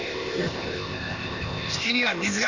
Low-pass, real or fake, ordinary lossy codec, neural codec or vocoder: 7.2 kHz; fake; none; codec, 16 kHz, 0.8 kbps, ZipCodec